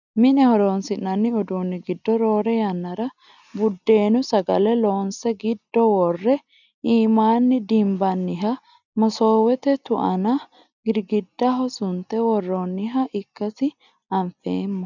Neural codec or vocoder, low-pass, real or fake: none; 7.2 kHz; real